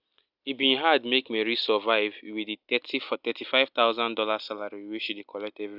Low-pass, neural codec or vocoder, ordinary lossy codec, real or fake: 5.4 kHz; none; none; real